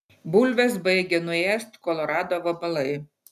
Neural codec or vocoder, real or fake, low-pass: none; real; 14.4 kHz